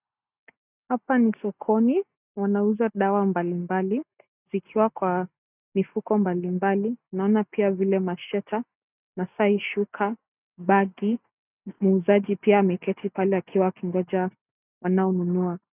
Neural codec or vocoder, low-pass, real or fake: none; 3.6 kHz; real